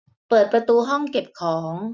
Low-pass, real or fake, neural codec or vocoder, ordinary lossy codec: none; real; none; none